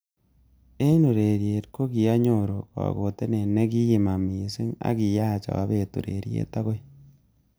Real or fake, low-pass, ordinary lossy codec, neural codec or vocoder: real; none; none; none